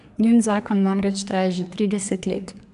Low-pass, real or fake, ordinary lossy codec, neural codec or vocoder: 10.8 kHz; fake; none; codec, 24 kHz, 1 kbps, SNAC